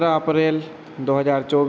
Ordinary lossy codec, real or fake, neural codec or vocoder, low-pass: none; real; none; none